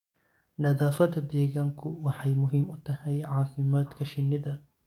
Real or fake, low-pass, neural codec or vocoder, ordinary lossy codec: fake; 19.8 kHz; codec, 44.1 kHz, 7.8 kbps, DAC; MP3, 96 kbps